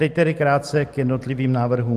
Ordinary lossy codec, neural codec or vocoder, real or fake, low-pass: Opus, 24 kbps; none; real; 14.4 kHz